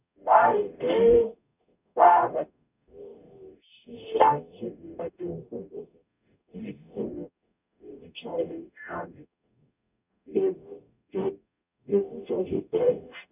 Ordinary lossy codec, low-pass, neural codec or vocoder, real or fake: none; 3.6 kHz; codec, 44.1 kHz, 0.9 kbps, DAC; fake